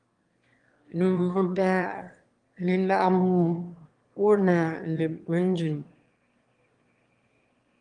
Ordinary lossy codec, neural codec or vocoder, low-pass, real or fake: Opus, 24 kbps; autoencoder, 22.05 kHz, a latent of 192 numbers a frame, VITS, trained on one speaker; 9.9 kHz; fake